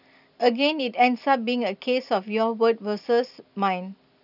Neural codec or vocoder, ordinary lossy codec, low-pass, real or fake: none; none; 5.4 kHz; real